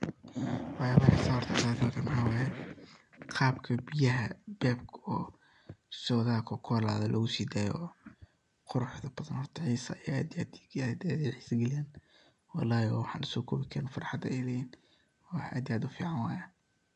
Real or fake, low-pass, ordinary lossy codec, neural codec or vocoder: real; 10.8 kHz; none; none